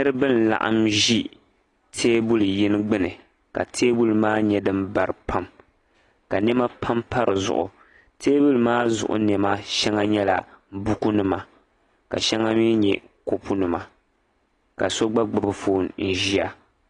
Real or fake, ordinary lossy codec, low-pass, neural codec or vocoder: real; AAC, 32 kbps; 10.8 kHz; none